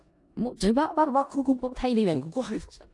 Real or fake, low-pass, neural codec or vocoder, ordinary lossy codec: fake; 10.8 kHz; codec, 16 kHz in and 24 kHz out, 0.4 kbps, LongCat-Audio-Codec, four codebook decoder; AAC, 64 kbps